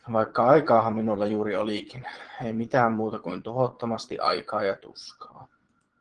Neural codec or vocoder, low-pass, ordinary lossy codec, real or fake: vocoder, 22.05 kHz, 80 mel bands, Vocos; 9.9 kHz; Opus, 16 kbps; fake